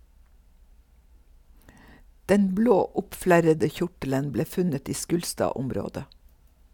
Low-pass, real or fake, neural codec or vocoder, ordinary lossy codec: 19.8 kHz; real; none; none